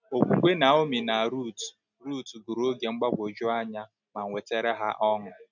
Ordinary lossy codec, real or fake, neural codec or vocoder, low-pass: none; real; none; 7.2 kHz